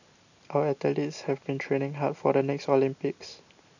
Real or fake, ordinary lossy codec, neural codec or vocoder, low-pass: real; none; none; 7.2 kHz